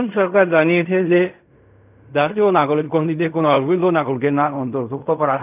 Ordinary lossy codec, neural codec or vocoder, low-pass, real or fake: none; codec, 16 kHz in and 24 kHz out, 0.4 kbps, LongCat-Audio-Codec, fine tuned four codebook decoder; 3.6 kHz; fake